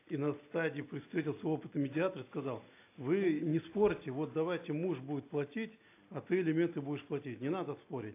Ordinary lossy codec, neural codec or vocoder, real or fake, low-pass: AAC, 24 kbps; none; real; 3.6 kHz